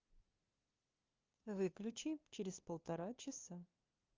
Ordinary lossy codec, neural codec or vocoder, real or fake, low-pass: Opus, 32 kbps; codec, 16 kHz, 2 kbps, FunCodec, trained on LibriTTS, 25 frames a second; fake; 7.2 kHz